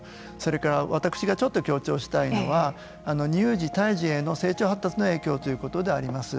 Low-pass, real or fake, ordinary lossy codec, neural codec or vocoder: none; real; none; none